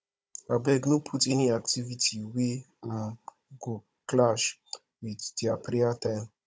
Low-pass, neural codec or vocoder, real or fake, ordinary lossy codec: none; codec, 16 kHz, 16 kbps, FunCodec, trained on Chinese and English, 50 frames a second; fake; none